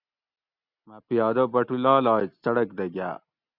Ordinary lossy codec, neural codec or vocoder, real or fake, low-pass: Opus, 64 kbps; none; real; 5.4 kHz